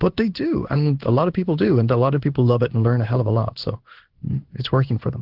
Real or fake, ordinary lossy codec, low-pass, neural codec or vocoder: fake; Opus, 16 kbps; 5.4 kHz; codec, 16 kHz in and 24 kHz out, 1 kbps, XY-Tokenizer